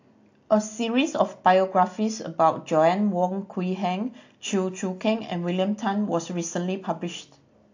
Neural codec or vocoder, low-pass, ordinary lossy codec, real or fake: none; 7.2 kHz; AAC, 48 kbps; real